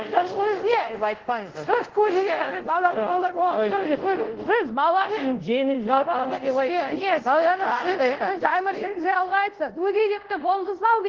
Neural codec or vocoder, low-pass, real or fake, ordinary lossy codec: codec, 24 kHz, 0.5 kbps, DualCodec; 7.2 kHz; fake; Opus, 16 kbps